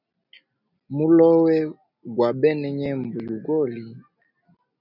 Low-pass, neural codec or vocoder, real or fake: 5.4 kHz; none; real